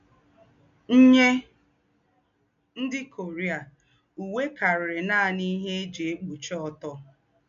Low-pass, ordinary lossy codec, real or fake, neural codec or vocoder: 7.2 kHz; MP3, 64 kbps; real; none